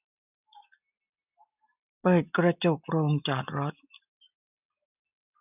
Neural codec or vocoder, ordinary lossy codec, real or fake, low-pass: none; none; real; 3.6 kHz